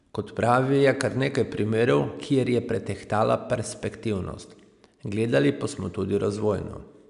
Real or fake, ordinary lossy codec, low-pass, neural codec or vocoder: real; none; 10.8 kHz; none